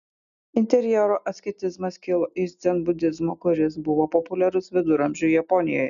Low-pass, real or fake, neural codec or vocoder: 7.2 kHz; real; none